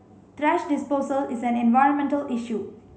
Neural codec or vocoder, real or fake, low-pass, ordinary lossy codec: none; real; none; none